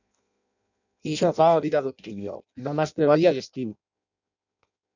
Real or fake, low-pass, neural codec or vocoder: fake; 7.2 kHz; codec, 16 kHz in and 24 kHz out, 0.6 kbps, FireRedTTS-2 codec